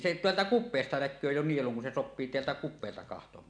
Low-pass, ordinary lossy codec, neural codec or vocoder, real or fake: 9.9 kHz; none; none; real